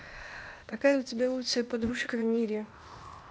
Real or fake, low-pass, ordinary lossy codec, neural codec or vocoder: fake; none; none; codec, 16 kHz, 0.8 kbps, ZipCodec